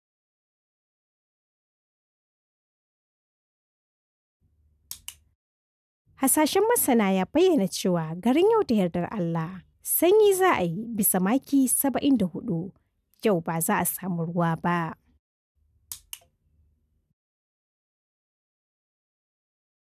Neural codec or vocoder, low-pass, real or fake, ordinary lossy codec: none; 14.4 kHz; real; none